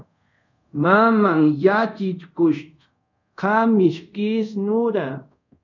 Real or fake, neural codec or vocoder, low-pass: fake; codec, 24 kHz, 0.5 kbps, DualCodec; 7.2 kHz